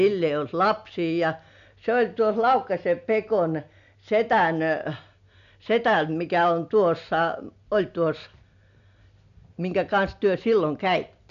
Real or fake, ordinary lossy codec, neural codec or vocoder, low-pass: real; none; none; 7.2 kHz